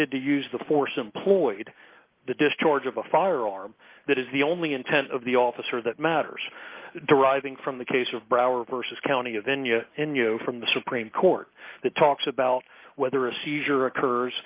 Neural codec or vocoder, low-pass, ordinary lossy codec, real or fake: none; 3.6 kHz; Opus, 32 kbps; real